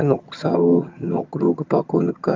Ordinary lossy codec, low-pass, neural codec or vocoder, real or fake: Opus, 24 kbps; 7.2 kHz; vocoder, 22.05 kHz, 80 mel bands, HiFi-GAN; fake